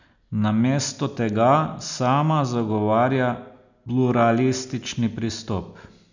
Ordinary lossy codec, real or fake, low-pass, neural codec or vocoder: none; real; 7.2 kHz; none